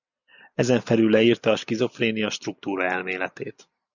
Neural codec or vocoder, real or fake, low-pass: none; real; 7.2 kHz